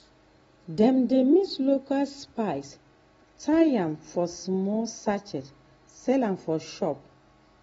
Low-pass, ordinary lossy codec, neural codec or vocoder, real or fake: 19.8 kHz; AAC, 24 kbps; none; real